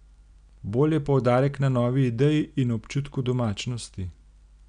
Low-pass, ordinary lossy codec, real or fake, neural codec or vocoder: 9.9 kHz; none; real; none